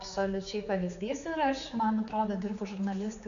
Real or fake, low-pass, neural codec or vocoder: fake; 7.2 kHz; codec, 16 kHz, 4 kbps, X-Codec, HuBERT features, trained on general audio